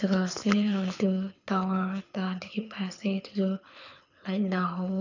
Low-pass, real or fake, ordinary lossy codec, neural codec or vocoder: 7.2 kHz; fake; none; codec, 24 kHz, 6 kbps, HILCodec